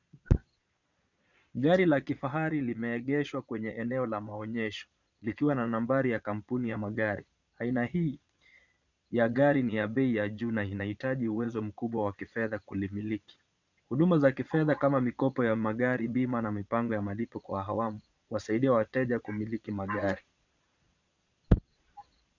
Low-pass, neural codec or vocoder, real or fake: 7.2 kHz; vocoder, 22.05 kHz, 80 mel bands, Vocos; fake